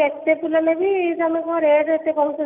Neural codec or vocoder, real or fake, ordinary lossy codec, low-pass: none; real; none; 3.6 kHz